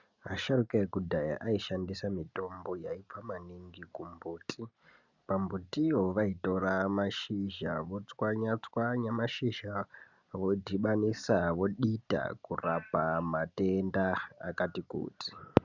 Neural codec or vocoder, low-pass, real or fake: none; 7.2 kHz; real